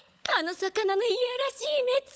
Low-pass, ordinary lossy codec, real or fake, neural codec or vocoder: none; none; fake; codec, 16 kHz, 16 kbps, FunCodec, trained on LibriTTS, 50 frames a second